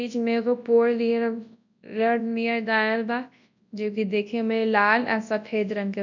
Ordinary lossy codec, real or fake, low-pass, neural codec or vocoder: none; fake; 7.2 kHz; codec, 24 kHz, 0.9 kbps, WavTokenizer, large speech release